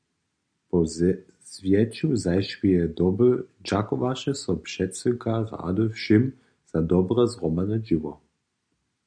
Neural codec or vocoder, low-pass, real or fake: none; 9.9 kHz; real